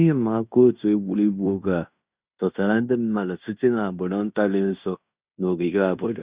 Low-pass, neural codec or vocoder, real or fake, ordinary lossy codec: 3.6 kHz; codec, 16 kHz in and 24 kHz out, 0.9 kbps, LongCat-Audio-Codec, fine tuned four codebook decoder; fake; Opus, 64 kbps